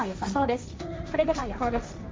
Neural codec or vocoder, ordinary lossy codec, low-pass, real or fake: codec, 16 kHz, 1.1 kbps, Voila-Tokenizer; none; none; fake